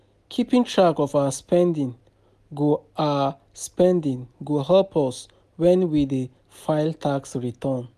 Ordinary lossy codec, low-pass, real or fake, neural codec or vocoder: none; 14.4 kHz; real; none